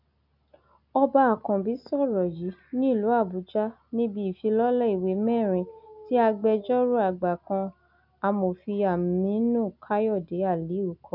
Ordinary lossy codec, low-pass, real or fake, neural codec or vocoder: none; 5.4 kHz; real; none